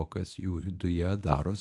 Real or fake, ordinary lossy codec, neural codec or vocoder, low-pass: fake; AAC, 64 kbps; codec, 24 kHz, 0.9 kbps, WavTokenizer, medium speech release version 1; 10.8 kHz